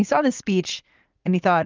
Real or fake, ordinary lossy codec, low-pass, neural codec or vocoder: fake; Opus, 32 kbps; 7.2 kHz; vocoder, 44.1 kHz, 80 mel bands, Vocos